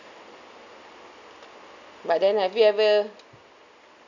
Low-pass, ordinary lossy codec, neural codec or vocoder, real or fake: 7.2 kHz; none; none; real